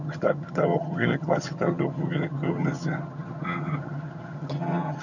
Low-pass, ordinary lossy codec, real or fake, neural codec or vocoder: 7.2 kHz; none; fake; vocoder, 22.05 kHz, 80 mel bands, HiFi-GAN